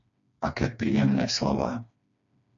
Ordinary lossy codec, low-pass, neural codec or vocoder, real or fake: MP3, 64 kbps; 7.2 kHz; codec, 16 kHz, 2 kbps, FreqCodec, smaller model; fake